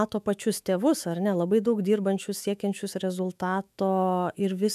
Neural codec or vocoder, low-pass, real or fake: autoencoder, 48 kHz, 128 numbers a frame, DAC-VAE, trained on Japanese speech; 14.4 kHz; fake